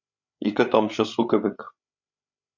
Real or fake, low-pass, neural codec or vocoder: fake; 7.2 kHz; codec, 16 kHz, 8 kbps, FreqCodec, larger model